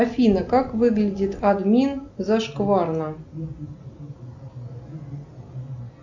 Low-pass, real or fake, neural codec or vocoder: 7.2 kHz; real; none